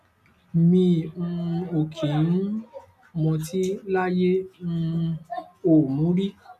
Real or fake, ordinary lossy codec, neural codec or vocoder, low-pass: real; none; none; 14.4 kHz